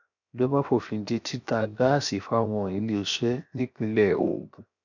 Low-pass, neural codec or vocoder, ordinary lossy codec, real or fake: 7.2 kHz; codec, 16 kHz, 0.7 kbps, FocalCodec; none; fake